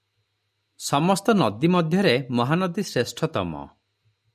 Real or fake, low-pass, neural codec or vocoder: real; 14.4 kHz; none